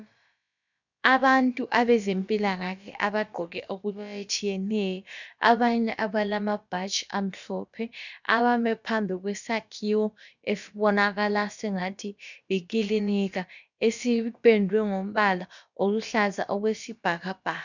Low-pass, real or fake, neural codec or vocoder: 7.2 kHz; fake; codec, 16 kHz, about 1 kbps, DyCAST, with the encoder's durations